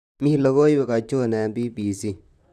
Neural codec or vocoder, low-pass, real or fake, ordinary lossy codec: vocoder, 44.1 kHz, 128 mel bands, Pupu-Vocoder; 14.4 kHz; fake; none